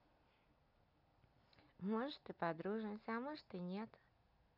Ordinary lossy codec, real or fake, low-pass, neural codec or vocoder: none; real; 5.4 kHz; none